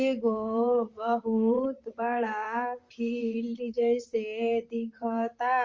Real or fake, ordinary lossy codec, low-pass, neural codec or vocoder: fake; Opus, 32 kbps; 7.2 kHz; vocoder, 44.1 kHz, 128 mel bands, Pupu-Vocoder